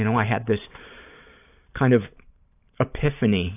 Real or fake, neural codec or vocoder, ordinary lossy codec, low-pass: fake; vocoder, 44.1 kHz, 128 mel bands every 512 samples, BigVGAN v2; AAC, 32 kbps; 3.6 kHz